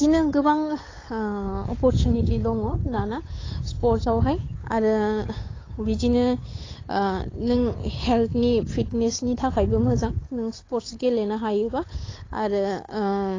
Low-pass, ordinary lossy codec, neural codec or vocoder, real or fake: 7.2 kHz; AAC, 32 kbps; codec, 16 kHz, 8 kbps, FunCodec, trained on Chinese and English, 25 frames a second; fake